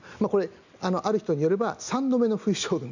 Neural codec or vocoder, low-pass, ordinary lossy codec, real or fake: none; 7.2 kHz; none; real